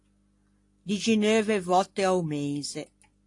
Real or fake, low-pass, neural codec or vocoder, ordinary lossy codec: real; 10.8 kHz; none; AAC, 48 kbps